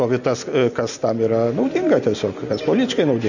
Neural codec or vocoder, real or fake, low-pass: none; real; 7.2 kHz